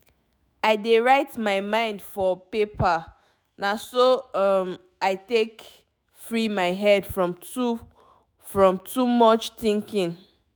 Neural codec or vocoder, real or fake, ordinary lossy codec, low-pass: autoencoder, 48 kHz, 128 numbers a frame, DAC-VAE, trained on Japanese speech; fake; none; none